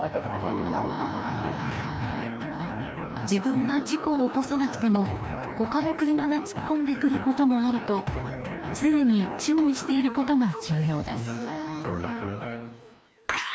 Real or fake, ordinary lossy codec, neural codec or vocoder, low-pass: fake; none; codec, 16 kHz, 1 kbps, FreqCodec, larger model; none